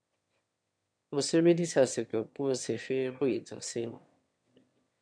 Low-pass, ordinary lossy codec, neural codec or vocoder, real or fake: 9.9 kHz; MP3, 64 kbps; autoencoder, 22.05 kHz, a latent of 192 numbers a frame, VITS, trained on one speaker; fake